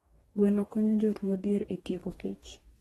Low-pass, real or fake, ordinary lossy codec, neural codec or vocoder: 19.8 kHz; fake; AAC, 32 kbps; codec, 44.1 kHz, 2.6 kbps, DAC